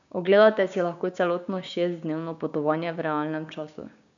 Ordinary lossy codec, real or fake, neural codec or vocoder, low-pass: none; fake; codec, 16 kHz, 6 kbps, DAC; 7.2 kHz